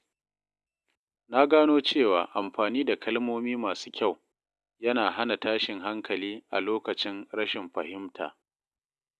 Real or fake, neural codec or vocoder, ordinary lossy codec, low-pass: real; none; none; none